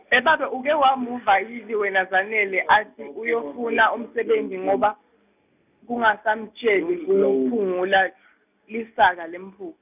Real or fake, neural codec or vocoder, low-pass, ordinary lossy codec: real; none; 3.6 kHz; none